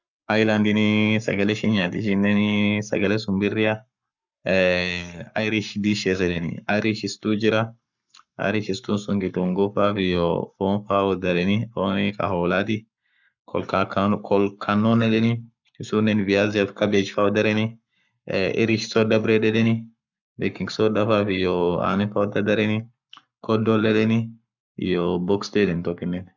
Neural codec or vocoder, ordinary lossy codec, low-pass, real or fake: codec, 44.1 kHz, 7.8 kbps, Pupu-Codec; none; 7.2 kHz; fake